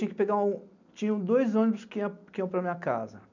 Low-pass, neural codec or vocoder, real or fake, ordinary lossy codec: 7.2 kHz; none; real; none